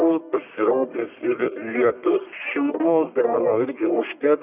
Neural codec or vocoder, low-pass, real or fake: codec, 44.1 kHz, 1.7 kbps, Pupu-Codec; 3.6 kHz; fake